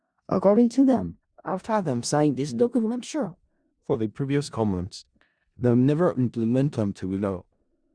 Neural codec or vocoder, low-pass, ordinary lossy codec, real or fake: codec, 16 kHz in and 24 kHz out, 0.4 kbps, LongCat-Audio-Codec, four codebook decoder; 9.9 kHz; Opus, 64 kbps; fake